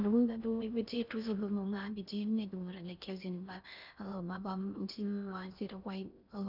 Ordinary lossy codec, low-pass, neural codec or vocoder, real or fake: none; 5.4 kHz; codec, 16 kHz in and 24 kHz out, 0.6 kbps, FocalCodec, streaming, 4096 codes; fake